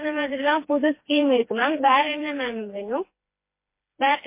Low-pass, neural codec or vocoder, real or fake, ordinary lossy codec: 3.6 kHz; codec, 16 kHz, 2 kbps, FreqCodec, smaller model; fake; MP3, 24 kbps